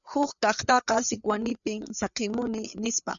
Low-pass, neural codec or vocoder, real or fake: 7.2 kHz; codec, 16 kHz, 4 kbps, FreqCodec, larger model; fake